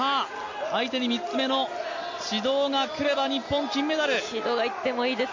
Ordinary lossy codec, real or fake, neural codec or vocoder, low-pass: MP3, 48 kbps; real; none; 7.2 kHz